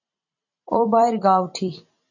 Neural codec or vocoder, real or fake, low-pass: vocoder, 44.1 kHz, 128 mel bands every 256 samples, BigVGAN v2; fake; 7.2 kHz